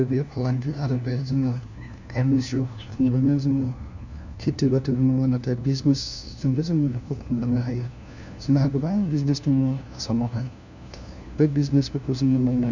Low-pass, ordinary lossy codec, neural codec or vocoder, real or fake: 7.2 kHz; none; codec, 16 kHz, 1 kbps, FunCodec, trained on LibriTTS, 50 frames a second; fake